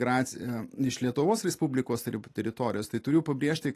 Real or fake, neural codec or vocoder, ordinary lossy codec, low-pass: real; none; AAC, 48 kbps; 14.4 kHz